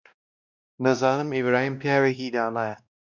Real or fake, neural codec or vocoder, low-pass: fake; codec, 16 kHz, 1 kbps, X-Codec, WavLM features, trained on Multilingual LibriSpeech; 7.2 kHz